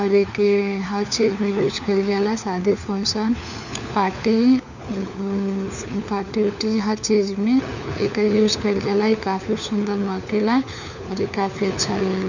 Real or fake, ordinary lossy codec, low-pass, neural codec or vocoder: fake; none; 7.2 kHz; codec, 16 kHz, 4 kbps, FunCodec, trained on LibriTTS, 50 frames a second